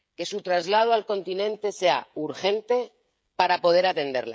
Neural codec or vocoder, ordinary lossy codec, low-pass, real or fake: codec, 16 kHz, 16 kbps, FreqCodec, smaller model; none; none; fake